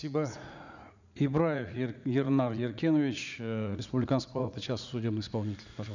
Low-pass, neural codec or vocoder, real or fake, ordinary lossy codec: 7.2 kHz; vocoder, 44.1 kHz, 80 mel bands, Vocos; fake; none